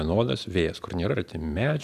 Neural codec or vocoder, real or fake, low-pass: none; real; 14.4 kHz